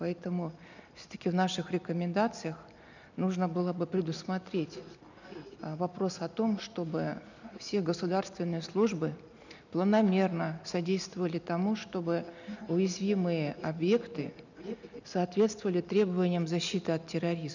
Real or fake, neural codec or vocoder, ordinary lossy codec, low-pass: real; none; none; 7.2 kHz